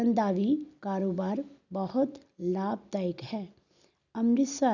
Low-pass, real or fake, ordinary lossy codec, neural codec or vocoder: 7.2 kHz; real; none; none